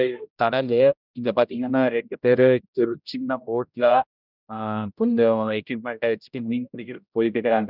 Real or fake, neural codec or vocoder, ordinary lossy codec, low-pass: fake; codec, 16 kHz, 0.5 kbps, X-Codec, HuBERT features, trained on general audio; none; 5.4 kHz